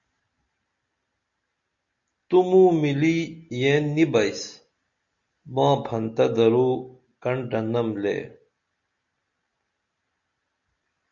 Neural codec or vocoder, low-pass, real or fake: none; 7.2 kHz; real